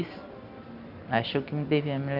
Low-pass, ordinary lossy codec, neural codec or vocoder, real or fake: 5.4 kHz; none; none; real